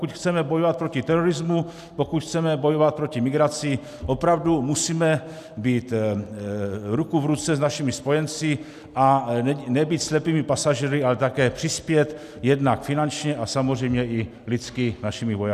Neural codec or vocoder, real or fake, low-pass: none; real; 14.4 kHz